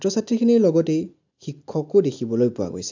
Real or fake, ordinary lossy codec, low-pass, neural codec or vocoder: real; AAC, 48 kbps; 7.2 kHz; none